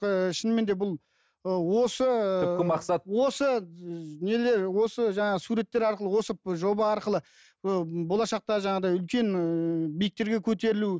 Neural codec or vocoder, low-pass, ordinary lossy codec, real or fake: none; none; none; real